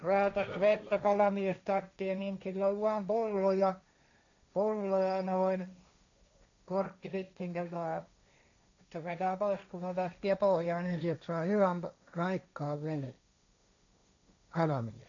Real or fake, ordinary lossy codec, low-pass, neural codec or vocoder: fake; none; 7.2 kHz; codec, 16 kHz, 1.1 kbps, Voila-Tokenizer